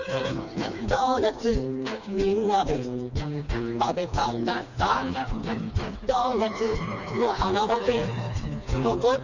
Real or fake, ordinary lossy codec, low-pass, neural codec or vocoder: fake; none; 7.2 kHz; codec, 16 kHz, 2 kbps, FreqCodec, smaller model